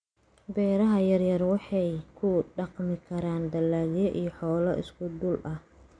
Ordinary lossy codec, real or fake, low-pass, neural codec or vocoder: none; real; 9.9 kHz; none